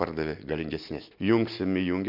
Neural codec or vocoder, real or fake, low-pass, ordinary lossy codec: none; real; 5.4 kHz; MP3, 48 kbps